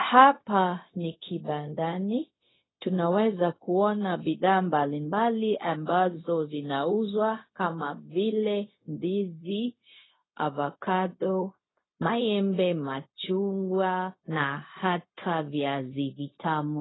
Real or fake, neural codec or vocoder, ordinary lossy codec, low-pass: fake; codec, 16 kHz, 0.4 kbps, LongCat-Audio-Codec; AAC, 16 kbps; 7.2 kHz